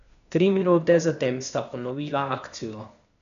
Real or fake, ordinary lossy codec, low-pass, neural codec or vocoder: fake; none; 7.2 kHz; codec, 16 kHz, 0.8 kbps, ZipCodec